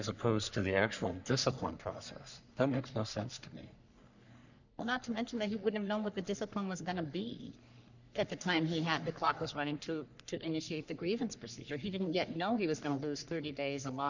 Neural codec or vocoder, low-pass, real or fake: codec, 44.1 kHz, 3.4 kbps, Pupu-Codec; 7.2 kHz; fake